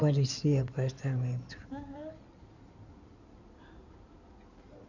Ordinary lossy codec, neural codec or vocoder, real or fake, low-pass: none; codec, 16 kHz in and 24 kHz out, 2.2 kbps, FireRedTTS-2 codec; fake; 7.2 kHz